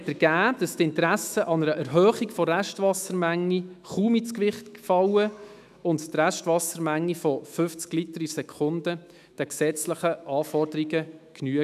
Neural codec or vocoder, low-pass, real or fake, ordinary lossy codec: autoencoder, 48 kHz, 128 numbers a frame, DAC-VAE, trained on Japanese speech; 14.4 kHz; fake; none